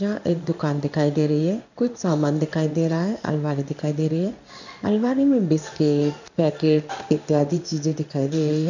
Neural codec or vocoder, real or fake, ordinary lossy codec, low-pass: codec, 16 kHz in and 24 kHz out, 1 kbps, XY-Tokenizer; fake; none; 7.2 kHz